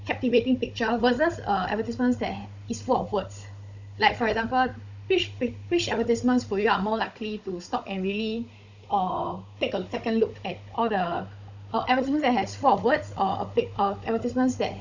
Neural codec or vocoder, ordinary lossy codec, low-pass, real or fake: codec, 16 kHz, 16 kbps, FunCodec, trained on Chinese and English, 50 frames a second; none; 7.2 kHz; fake